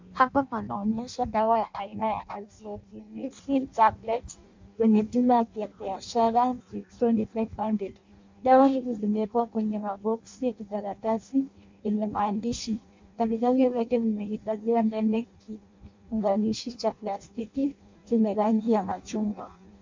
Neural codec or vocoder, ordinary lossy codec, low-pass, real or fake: codec, 16 kHz in and 24 kHz out, 0.6 kbps, FireRedTTS-2 codec; MP3, 64 kbps; 7.2 kHz; fake